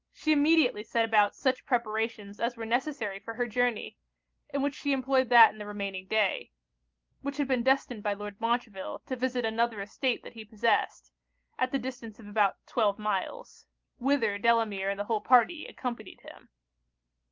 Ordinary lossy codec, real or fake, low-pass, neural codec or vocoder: Opus, 32 kbps; real; 7.2 kHz; none